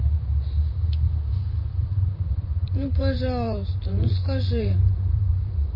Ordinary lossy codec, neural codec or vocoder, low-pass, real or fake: MP3, 24 kbps; vocoder, 44.1 kHz, 128 mel bands, Pupu-Vocoder; 5.4 kHz; fake